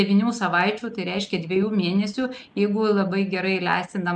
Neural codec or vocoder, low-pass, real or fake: none; 10.8 kHz; real